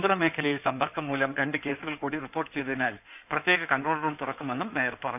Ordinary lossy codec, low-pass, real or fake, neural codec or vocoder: none; 3.6 kHz; fake; codec, 16 kHz in and 24 kHz out, 2.2 kbps, FireRedTTS-2 codec